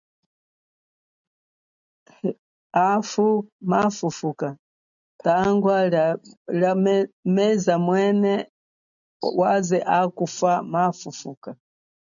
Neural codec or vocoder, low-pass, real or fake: none; 7.2 kHz; real